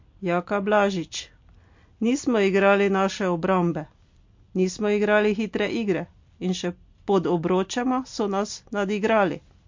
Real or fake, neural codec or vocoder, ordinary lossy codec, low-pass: real; none; MP3, 48 kbps; 7.2 kHz